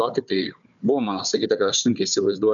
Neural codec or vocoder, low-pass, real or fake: codec, 16 kHz, 4 kbps, FunCodec, trained on Chinese and English, 50 frames a second; 7.2 kHz; fake